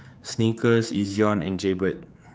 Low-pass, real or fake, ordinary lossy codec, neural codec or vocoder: none; fake; none; codec, 16 kHz, 4 kbps, X-Codec, HuBERT features, trained on general audio